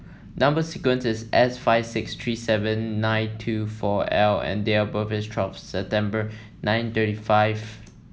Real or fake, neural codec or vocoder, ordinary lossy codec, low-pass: real; none; none; none